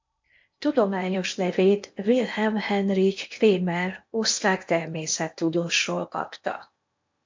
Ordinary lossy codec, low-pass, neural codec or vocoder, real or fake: MP3, 48 kbps; 7.2 kHz; codec, 16 kHz in and 24 kHz out, 0.8 kbps, FocalCodec, streaming, 65536 codes; fake